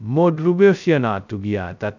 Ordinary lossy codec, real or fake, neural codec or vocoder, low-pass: none; fake; codec, 16 kHz, 0.2 kbps, FocalCodec; 7.2 kHz